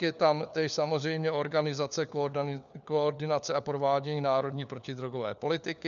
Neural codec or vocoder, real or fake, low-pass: codec, 16 kHz, 4 kbps, FunCodec, trained on LibriTTS, 50 frames a second; fake; 7.2 kHz